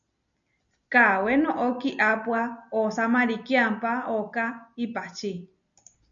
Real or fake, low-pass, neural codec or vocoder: real; 7.2 kHz; none